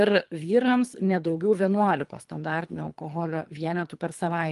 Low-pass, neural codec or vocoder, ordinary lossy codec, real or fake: 10.8 kHz; codec, 24 kHz, 3 kbps, HILCodec; Opus, 32 kbps; fake